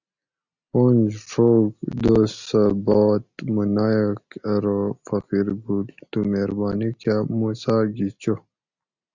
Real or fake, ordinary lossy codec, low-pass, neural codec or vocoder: real; Opus, 64 kbps; 7.2 kHz; none